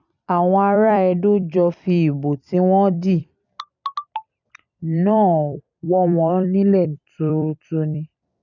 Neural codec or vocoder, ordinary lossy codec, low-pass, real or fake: vocoder, 44.1 kHz, 128 mel bands every 256 samples, BigVGAN v2; none; 7.2 kHz; fake